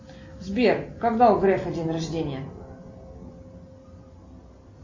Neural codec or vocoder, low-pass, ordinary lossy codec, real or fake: none; 7.2 kHz; MP3, 32 kbps; real